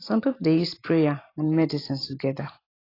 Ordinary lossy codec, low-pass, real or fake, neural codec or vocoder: AAC, 24 kbps; 5.4 kHz; fake; vocoder, 44.1 kHz, 128 mel bands every 512 samples, BigVGAN v2